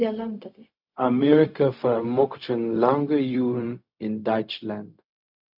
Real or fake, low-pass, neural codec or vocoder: fake; 5.4 kHz; codec, 16 kHz, 0.4 kbps, LongCat-Audio-Codec